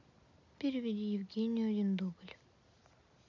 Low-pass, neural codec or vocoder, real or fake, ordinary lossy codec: 7.2 kHz; none; real; none